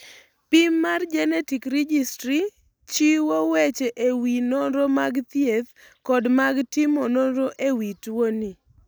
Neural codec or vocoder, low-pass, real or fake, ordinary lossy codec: none; none; real; none